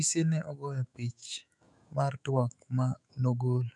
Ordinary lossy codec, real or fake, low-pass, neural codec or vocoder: none; fake; 10.8 kHz; autoencoder, 48 kHz, 128 numbers a frame, DAC-VAE, trained on Japanese speech